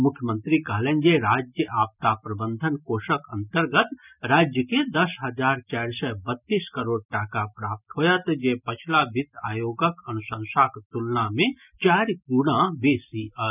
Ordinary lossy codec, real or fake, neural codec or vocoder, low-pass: none; real; none; 3.6 kHz